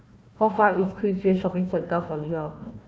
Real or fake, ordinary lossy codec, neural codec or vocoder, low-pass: fake; none; codec, 16 kHz, 1 kbps, FunCodec, trained on Chinese and English, 50 frames a second; none